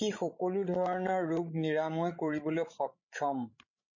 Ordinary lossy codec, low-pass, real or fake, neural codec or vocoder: MP3, 32 kbps; 7.2 kHz; fake; codec, 16 kHz, 16 kbps, FreqCodec, larger model